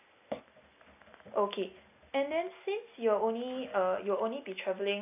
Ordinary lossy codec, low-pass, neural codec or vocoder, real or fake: AAC, 32 kbps; 3.6 kHz; none; real